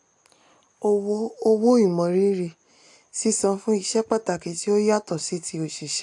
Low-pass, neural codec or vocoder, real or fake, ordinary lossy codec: 10.8 kHz; none; real; AAC, 64 kbps